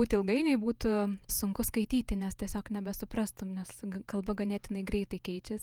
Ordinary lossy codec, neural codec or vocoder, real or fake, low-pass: Opus, 32 kbps; vocoder, 48 kHz, 128 mel bands, Vocos; fake; 19.8 kHz